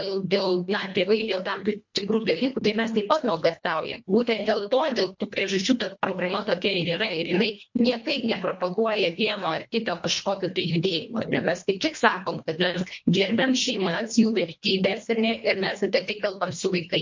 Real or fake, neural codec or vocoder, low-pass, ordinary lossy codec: fake; codec, 24 kHz, 1.5 kbps, HILCodec; 7.2 kHz; MP3, 48 kbps